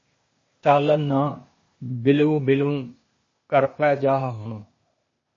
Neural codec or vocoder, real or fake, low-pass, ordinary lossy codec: codec, 16 kHz, 0.8 kbps, ZipCodec; fake; 7.2 kHz; MP3, 32 kbps